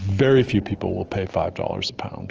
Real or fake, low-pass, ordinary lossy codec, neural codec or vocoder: real; 7.2 kHz; Opus, 16 kbps; none